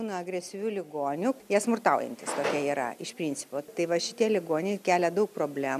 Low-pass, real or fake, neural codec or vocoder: 14.4 kHz; real; none